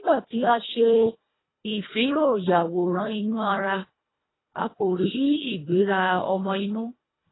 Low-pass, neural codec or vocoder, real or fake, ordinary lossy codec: 7.2 kHz; codec, 24 kHz, 1.5 kbps, HILCodec; fake; AAC, 16 kbps